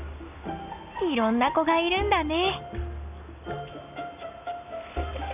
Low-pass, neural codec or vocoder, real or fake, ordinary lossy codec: 3.6 kHz; none; real; AAC, 32 kbps